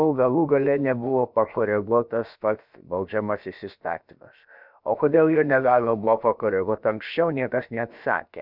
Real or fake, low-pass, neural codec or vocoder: fake; 5.4 kHz; codec, 16 kHz, about 1 kbps, DyCAST, with the encoder's durations